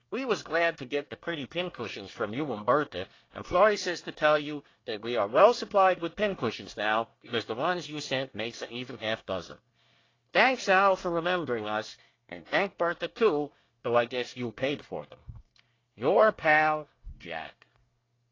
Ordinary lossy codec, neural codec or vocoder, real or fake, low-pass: AAC, 32 kbps; codec, 24 kHz, 1 kbps, SNAC; fake; 7.2 kHz